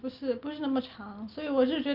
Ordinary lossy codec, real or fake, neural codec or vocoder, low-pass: Opus, 24 kbps; real; none; 5.4 kHz